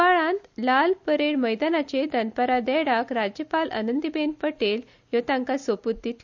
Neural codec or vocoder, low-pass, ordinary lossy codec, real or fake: none; 7.2 kHz; none; real